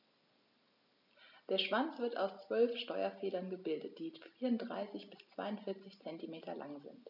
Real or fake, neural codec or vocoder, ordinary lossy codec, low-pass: real; none; none; 5.4 kHz